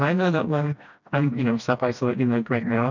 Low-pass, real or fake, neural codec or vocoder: 7.2 kHz; fake; codec, 16 kHz, 1 kbps, FreqCodec, smaller model